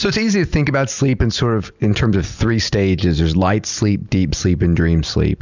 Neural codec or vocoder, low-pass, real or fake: none; 7.2 kHz; real